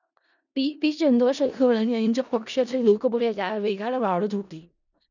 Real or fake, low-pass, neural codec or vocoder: fake; 7.2 kHz; codec, 16 kHz in and 24 kHz out, 0.4 kbps, LongCat-Audio-Codec, four codebook decoder